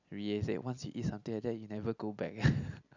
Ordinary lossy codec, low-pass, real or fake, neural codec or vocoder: none; 7.2 kHz; real; none